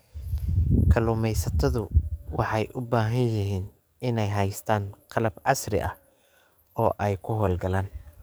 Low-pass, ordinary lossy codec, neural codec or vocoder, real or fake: none; none; codec, 44.1 kHz, 7.8 kbps, DAC; fake